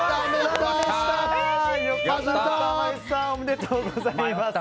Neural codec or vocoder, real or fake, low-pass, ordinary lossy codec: none; real; none; none